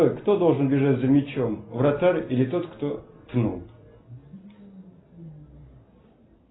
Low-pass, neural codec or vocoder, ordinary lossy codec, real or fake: 7.2 kHz; none; AAC, 16 kbps; real